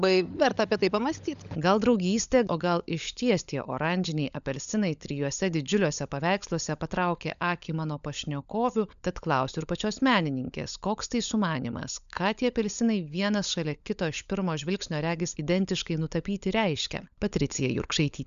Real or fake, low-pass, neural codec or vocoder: fake; 7.2 kHz; codec, 16 kHz, 16 kbps, FunCodec, trained on LibriTTS, 50 frames a second